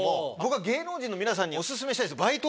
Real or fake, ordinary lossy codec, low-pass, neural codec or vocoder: real; none; none; none